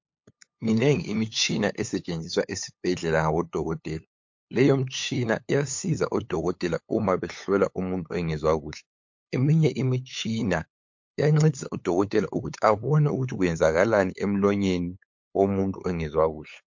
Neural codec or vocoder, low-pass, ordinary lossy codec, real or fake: codec, 16 kHz, 8 kbps, FunCodec, trained on LibriTTS, 25 frames a second; 7.2 kHz; MP3, 48 kbps; fake